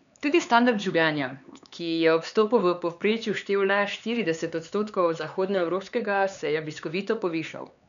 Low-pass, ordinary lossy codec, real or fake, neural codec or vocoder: 7.2 kHz; none; fake; codec, 16 kHz, 4 kbps, X-Codec, HuBERT features, trained on LibriSpeech